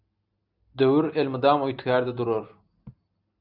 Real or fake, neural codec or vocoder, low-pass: real; none; 5.4 kHz